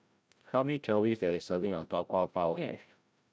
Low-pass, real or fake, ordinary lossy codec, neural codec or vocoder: none; fake; none; codec, 16 kHz, 0.5 kbps, FreqCodec, larger model